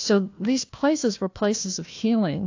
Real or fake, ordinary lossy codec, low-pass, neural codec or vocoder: fake; AAC, 48 kbps; 7.2 kHz; codec, 16 kHz, 1 kbps, FunCodec, trained on Chinese and English, 50 frames a second